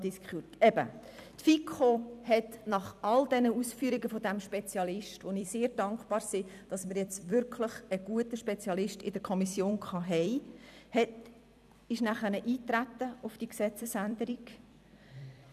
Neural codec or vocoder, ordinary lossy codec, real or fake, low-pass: vocoder, 44.1 kHz, 128 mel bands every 256 samples, BigVGAN v2; none; fake; 14.4 kHz